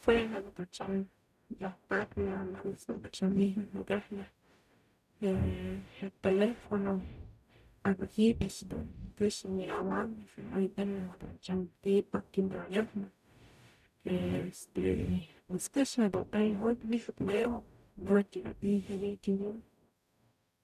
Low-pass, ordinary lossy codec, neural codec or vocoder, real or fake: 14.4 kHz; none; codec, 44.1 kHz, 0.9 kbps, DAC; fake